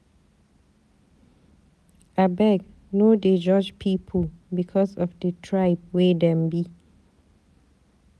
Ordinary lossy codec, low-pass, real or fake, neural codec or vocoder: none; none; real; none